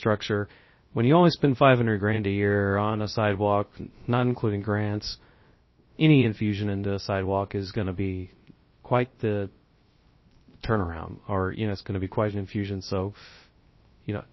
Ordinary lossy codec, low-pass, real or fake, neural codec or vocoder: MP3, 24 kbps; 7.2 kHz; fake; codec, 16 kHz, 0.3 kbps, FocalCodec